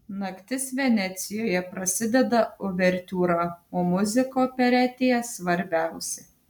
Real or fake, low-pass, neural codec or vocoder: real; 19.8 kHz; none